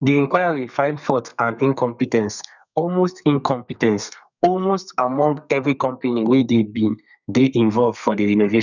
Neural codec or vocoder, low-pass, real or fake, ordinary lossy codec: codec, 32 kHz, 1.9 kbps, SNAC; 7.2 kHz; fake; none